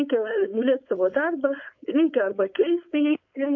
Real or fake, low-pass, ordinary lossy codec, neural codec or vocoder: fake; 7.2 kHz; MP3, 64 kbps; codec, 16 kHz, 4.8 kbps, FACodec